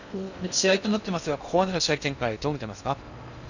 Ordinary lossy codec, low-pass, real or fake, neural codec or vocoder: none; 7.2 kHz; fake; codec, 16 kHz in and 24 kHz out, 0.6 kbps, FocalCodec, streaming, 2048 codes